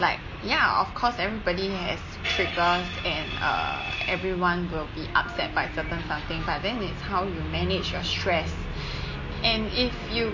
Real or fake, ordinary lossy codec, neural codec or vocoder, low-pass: real; MP3, 32 kbps; none; 7.2 kHz